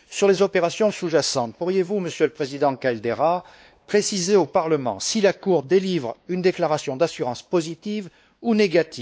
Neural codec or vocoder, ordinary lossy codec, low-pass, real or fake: codec, 16 kHz, 2 kbps, X-Codec, WavLM features, trained on Multilingual LibriSpeech; none; none; fake